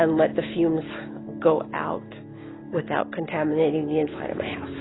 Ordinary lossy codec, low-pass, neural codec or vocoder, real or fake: AAC, 16 kbps; 7.2 kHz; none; real